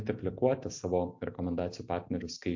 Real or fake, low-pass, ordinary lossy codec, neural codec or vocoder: real; 7.2 kHz; MP3, 48 kbps; none